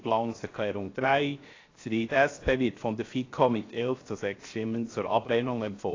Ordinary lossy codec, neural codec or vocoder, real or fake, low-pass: AAC, 32 kbps; codec, 16 kHz, 0.7 kbps, FocalCodec; fake; 7.2 kHz